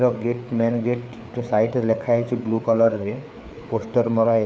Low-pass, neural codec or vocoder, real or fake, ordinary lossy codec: none; codec, 16 kHz, 4 kbps, FreqCodec, larger model; fake; none